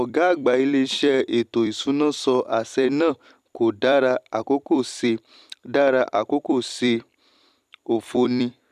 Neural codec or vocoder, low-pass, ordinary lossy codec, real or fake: vocoder, 44.1 kHz, 128 mel bands every 256 samples, BigVGAN v2; 14.4 kHz; none; fake